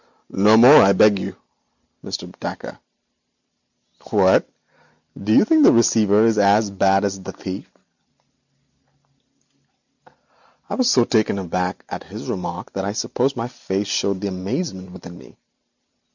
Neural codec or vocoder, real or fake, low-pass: none; real; 7.2 kHz